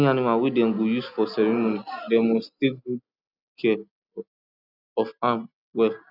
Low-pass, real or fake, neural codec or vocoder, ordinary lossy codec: 5.4 kHz; real; none; none